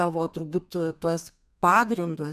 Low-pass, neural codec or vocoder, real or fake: 14.4 kHz; codec, 32 kHz, 1.9 kbps, SNAC; fake